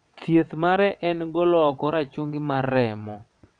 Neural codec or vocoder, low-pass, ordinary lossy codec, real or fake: vocoder, 22.05 kHz, 80 mel bands, WaveNeXt; 9.9 kHz; none; fake